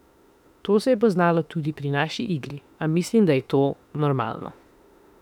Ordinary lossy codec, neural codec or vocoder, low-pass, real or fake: none; autoencoder, 48 kHz, 32 numbers a frame, DAC-VAE, trained on Japanese speech; 19.8 kHz; fake